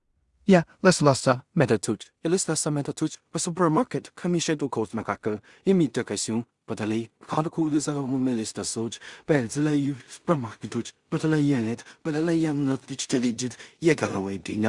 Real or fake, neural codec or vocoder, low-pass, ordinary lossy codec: fake; codec, 16 kHz in and 24 kHz out, 0.4 kbps, LongCat-Audio-Codec, two codebook decoder; 10.8 kHz; Opus, 64 kbps